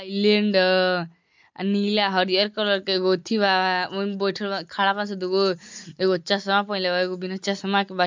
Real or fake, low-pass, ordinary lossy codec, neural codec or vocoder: real; 7.2 kHz; MP3, 64 kbps; none